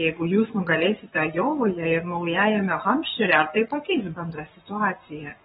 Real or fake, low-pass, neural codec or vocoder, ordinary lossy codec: fake; 19.8 kHz; codec, 44.1 kHz, 7.8 kbps, Pupu-Codec; AAC, 16 kbps